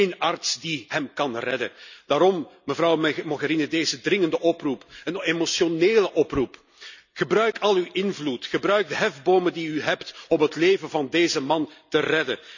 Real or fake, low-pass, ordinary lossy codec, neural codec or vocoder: real; 7.2 kHz; none; none